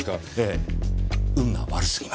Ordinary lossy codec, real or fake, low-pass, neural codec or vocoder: none; real; none; none